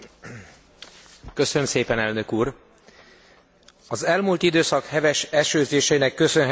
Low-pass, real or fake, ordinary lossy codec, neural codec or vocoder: none; real; none; none